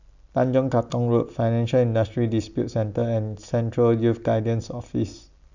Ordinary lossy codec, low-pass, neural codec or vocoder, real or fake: none; 7.2 kHz; none; real